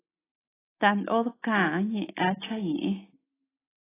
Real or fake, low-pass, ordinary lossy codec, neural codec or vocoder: real; 3.6 kHz; AAC, 16 kbps; none